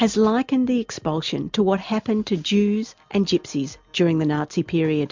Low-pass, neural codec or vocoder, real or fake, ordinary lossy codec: 7.2 kHz; none; real; MP3, 64 kbps